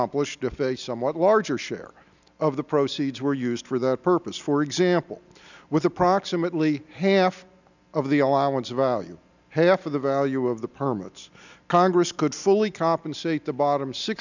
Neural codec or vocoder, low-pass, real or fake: none; 7.2 kHz; real